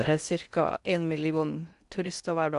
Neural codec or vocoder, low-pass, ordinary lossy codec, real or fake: codec, 16 kHz in and 24 kHz out, 0.6 kbps, FocalCodec, streaming, 4096 codes; 10.8 kHz; MP3, 96 kbps; fake